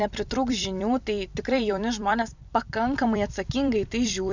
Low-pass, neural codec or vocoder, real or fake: 7.2 kHz; vocoder, 44.1 kHz, 128 mel bands every 256 samples, BigVGAN v2; fake